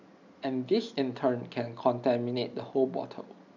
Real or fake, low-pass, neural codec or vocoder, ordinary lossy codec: real; 7.2 kHz; none; none